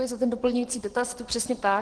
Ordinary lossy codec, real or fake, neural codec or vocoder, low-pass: Opus, 16 kbps; real; none; 10.8 kHz